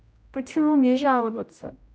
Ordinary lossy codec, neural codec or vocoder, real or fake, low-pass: none; codec, 16 kHz, 0.5 kbps, X-Codec, HuBERT features, trained on general audio; fake; none